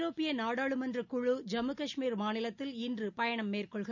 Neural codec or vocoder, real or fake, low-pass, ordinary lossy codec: none; real; 7.2 kHz; none